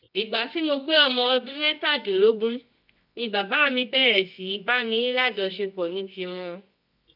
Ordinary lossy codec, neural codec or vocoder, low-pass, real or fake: none; codec, 24 kHz, 0.9 kbps, WavTokenizer, medium music audio release; 5.4 kHz; fake